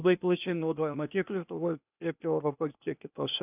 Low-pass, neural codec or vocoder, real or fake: 3.6 kHz; codec, 16 kHz, 0.8 kbps, ZipCodec; fake